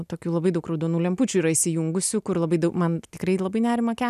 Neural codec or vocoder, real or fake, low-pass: none; real; 14.4 kHz